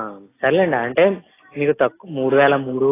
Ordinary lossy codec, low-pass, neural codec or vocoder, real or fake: AAC, 16 kbps; 3.6 kHz; none; real